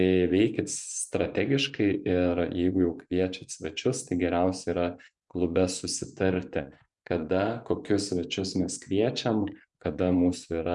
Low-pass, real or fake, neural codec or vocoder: 10.8 kHz; fake; vocoder, 24 kHz, 100 mel bands, Vocos